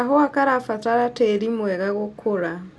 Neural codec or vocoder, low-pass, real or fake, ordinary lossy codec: none; none; real; none